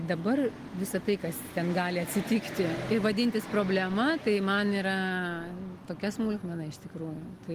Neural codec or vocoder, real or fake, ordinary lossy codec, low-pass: none; real; Opus, 24 kbps; 14.4 kHz